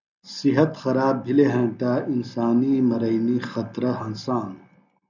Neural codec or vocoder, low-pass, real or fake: none; 7.2 kHz; real